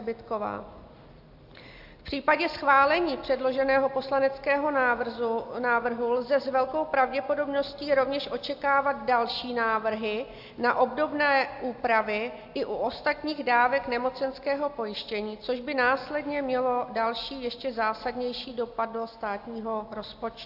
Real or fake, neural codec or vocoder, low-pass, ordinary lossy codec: real; none; 5.4 kHz; AAC, 48 kbps